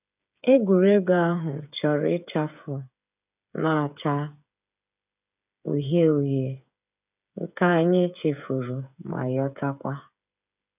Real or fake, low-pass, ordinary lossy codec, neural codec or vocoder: fake; 3.6 kHz; none; codec, 16 kHz, 8 kbps, FreqCodec, smaller model